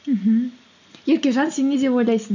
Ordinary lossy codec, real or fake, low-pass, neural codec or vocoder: none; real; 7.2 kHz; none